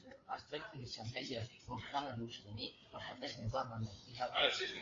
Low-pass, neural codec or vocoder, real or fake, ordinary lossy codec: 7.2 kHz; codec, 16 kHz, 4 kbps, FreqCodec, larger model; fake; AAC, 32 kbps